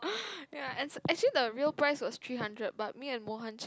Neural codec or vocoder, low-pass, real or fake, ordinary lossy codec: none; none; real; none